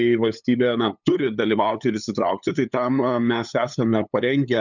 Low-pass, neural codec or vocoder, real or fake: 7.2 kHz; codec, 16 kHz, 8 kbps, FunCodec, trained on LibriTTS, 25 frames a second; fake